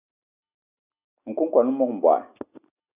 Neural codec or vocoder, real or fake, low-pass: none; real; 3.6 kHz